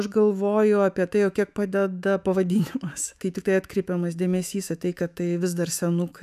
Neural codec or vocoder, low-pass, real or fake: autoencoder, 48 kHz, 128 numbers a frame, DAC-VAE, trained on Japanese speech; 14.4 kHz; fake